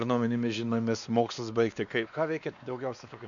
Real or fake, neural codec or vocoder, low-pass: fake; codec, 16 kHz, 2 kbps, X-Codec, WavLM features, trained on Multilingual LibriSpeech; 7.2 kHz